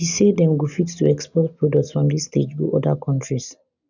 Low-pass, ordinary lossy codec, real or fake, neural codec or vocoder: 7.2 kHz; none; real; none